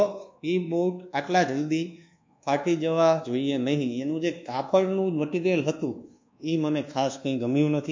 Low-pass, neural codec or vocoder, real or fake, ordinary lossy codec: 7.2 kHz; codec, 24 kHz, 1.2 kbps, DualCodec; fake; MP3, 48 kbps